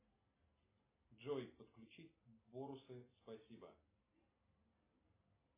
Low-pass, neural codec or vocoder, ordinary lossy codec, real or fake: 3.6 kHz; none; MP3, 16 kbps; real